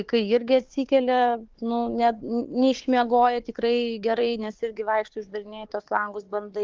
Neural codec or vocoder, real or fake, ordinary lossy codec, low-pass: codec, 16 kHz, 4 kbps, FunCodec, trained on Chinese and English, 50 frames a second; fake; Opus, 16 kbps; 7.2 kHz